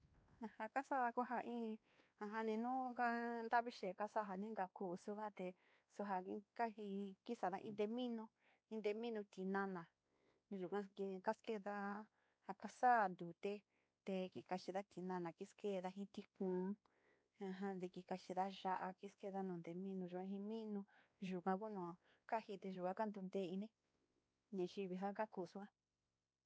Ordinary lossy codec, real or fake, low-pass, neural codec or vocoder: none; fake; none; codec, 16 kHz, 2 kbps, X-Codec, WavLM features, trained on Multilingual LibriSpeech